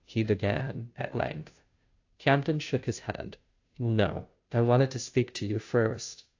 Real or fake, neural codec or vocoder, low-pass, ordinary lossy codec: fake; codec, 16 kHz, 0.5 kbps, FunCodec, trained on Chinese and English, 25 frames a second; 7.2 kHz; AAC, 48 kbps